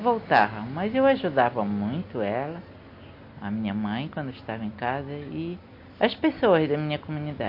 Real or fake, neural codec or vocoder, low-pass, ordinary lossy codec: real; none; 5.4 kHz; MP3, 32 kbps